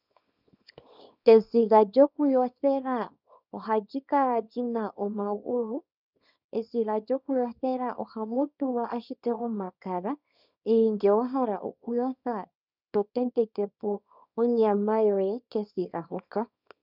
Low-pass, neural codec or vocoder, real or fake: 5.4 kHz; codec, 24 kHz, 0.9 kbps, WavTokenizer, small release; fake